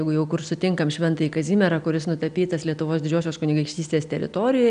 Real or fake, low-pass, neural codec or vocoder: real; 9.9 kHz; none